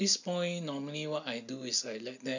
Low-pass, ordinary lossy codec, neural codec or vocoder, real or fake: 7.2 kHz; none; none; real